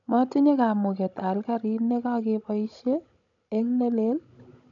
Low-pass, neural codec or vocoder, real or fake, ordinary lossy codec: 7.2 kHz; codec, 16 kHz, 16 kbps, FunCodec, trained on Chinese and English, 50 frames a second; fake; none